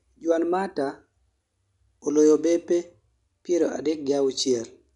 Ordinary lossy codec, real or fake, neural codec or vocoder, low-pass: none; real; none; 10.8 kHz